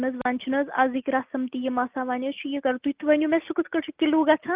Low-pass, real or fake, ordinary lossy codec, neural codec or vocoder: 3.6 kHz; real; Opus, 32 kbps; none